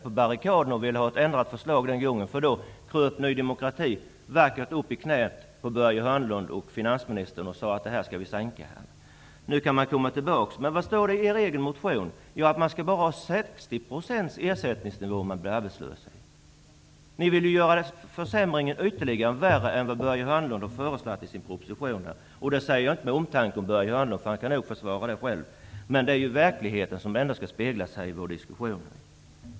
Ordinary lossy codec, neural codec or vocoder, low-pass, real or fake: none; none; none; real